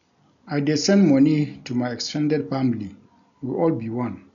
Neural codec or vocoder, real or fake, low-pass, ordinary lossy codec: none; real; 7.2 kHz; none